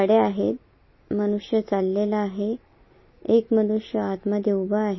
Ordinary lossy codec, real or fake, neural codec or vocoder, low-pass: MP3, 24 kbps; real; none; 7.2 kHz